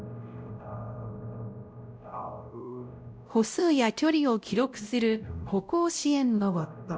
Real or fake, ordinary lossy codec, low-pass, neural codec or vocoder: fake; none; none; codec, 16 kHz, 0.5 kbps, X-Codec, WavLM features, trained on Multilingual LibriSpeech